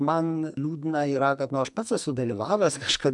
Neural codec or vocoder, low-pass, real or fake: codec, 44.1 kHz, 2.6 kbps, SNAC; 10.8 kHz; fake